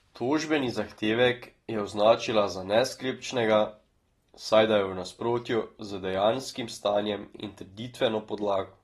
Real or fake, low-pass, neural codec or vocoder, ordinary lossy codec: real; 19.8 kHz; none; AAC, 32 kbps